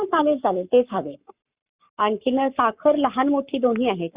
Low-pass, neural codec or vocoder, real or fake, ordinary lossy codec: 3.6 kHz; codec, 44.1 kHz, 7.8 kbps, Pupu-Codec; fake; Opus, 64 kbps